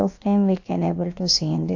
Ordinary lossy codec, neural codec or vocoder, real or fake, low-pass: AAC, 48 kbps; codec, 16 kHz, about 1 kbps, DyCAST, with the encoder's durations; fake; 7.2 kHz